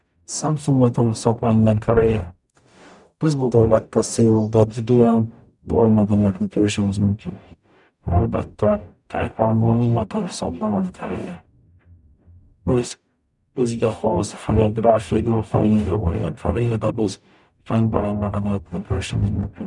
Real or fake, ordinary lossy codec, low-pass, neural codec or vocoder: fake; none; 10.8 kHz; codec, 44.1 kHz, 0.9 kbps, DAC